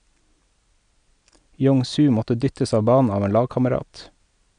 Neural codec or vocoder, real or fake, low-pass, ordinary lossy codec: none; real; 9.9 kHz; none